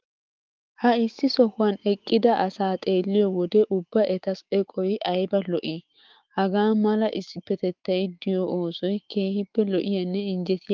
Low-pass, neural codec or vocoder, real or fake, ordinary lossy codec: 7.2 kHz; autoencoder, 48 kHz, 128 numbers a frame, DAC-VAE, trained on Japanese speech; fake; Opus, 32 kbps